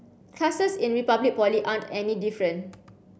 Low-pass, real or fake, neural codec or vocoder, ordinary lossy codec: none; real; none; none